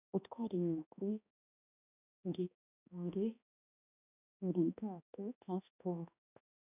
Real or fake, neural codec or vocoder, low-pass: fake; codec, 16 kHz, 1 kbps, X-Codec, HuBERT features, trained on balanced general audio; 3.6 kHz